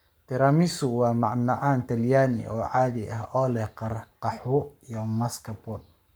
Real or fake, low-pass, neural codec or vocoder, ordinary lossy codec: fake; none; codec, 44.1 kHz, 7.8 kbps, Pupu-Codec; none